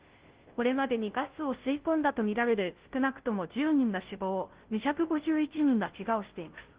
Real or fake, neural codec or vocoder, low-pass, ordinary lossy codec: fake; codec, 16 kHz, 0.5 kbps, FunCodec, trained on Chinese and English, 25 frames a second; 3.6 kHz; Opus, 16 kbps